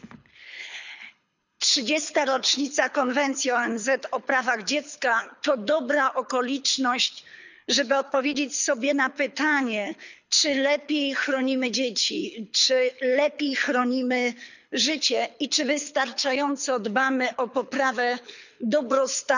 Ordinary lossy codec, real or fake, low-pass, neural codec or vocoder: none; fake; 7.2 kHz; codec, 24 kHz, 6 kbps, HILCodec